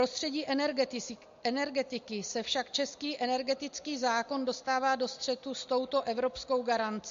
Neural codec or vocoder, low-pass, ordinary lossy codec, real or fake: none; 7.2 kHz; MP3, 48 kbps; real